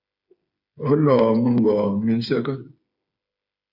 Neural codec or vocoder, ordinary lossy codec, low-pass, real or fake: codec, 16 kHz, 8 kbps, FreqCodec, smaller model; MP3, 48 kbps; 5.4 kHz; fake